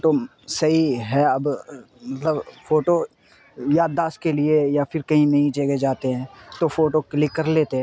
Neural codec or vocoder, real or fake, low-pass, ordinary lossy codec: none; real; none; none